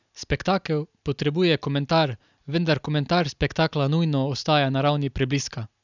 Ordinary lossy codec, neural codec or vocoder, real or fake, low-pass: none; none; real; 7.2 kHz